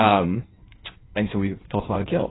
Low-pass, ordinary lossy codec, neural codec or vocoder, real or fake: 7.2 kHz; AAC, 16 kbps; codec, 16 kHz in and 24 kHz out, 1.1 kbps, FireRedTTS-2 codec; fake